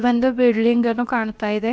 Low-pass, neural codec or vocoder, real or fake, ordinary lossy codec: none; codec, 16 kHz, about 1 kbps, DyCAST, with the encoder's durations; fake; none